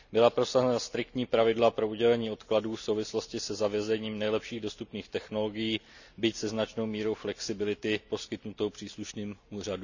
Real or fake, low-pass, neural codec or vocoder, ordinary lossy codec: real; 7.2 kHz; none; none